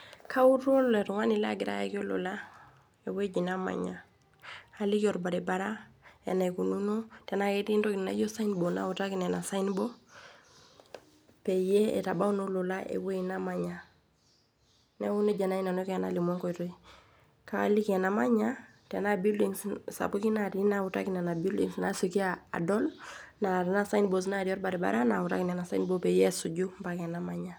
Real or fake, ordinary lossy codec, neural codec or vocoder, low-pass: real; none; none; none